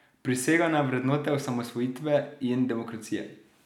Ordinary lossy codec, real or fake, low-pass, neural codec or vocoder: none; real; 19.8 kHz; none